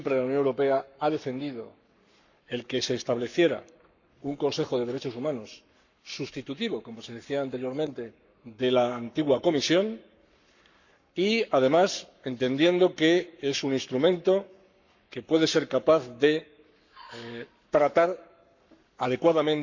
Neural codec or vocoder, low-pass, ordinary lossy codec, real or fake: codec, 44.1 kHz, 7.8 kbps, Pupu-Codec; 7.2 kHz; none; fake